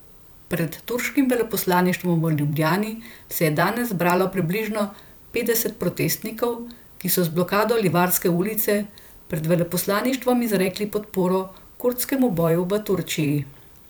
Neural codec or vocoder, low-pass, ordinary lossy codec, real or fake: vocoder, 44.1 kHz, 128 mel bands every 512 samples, BigVGAN v2; none; none; fake